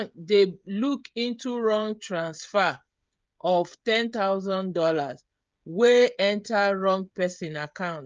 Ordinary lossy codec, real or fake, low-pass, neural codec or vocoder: Opus, 24 kbps; fake; 7.2 kHz; codec, 16 kHz, 16 kbps, FreqCodec, smaller model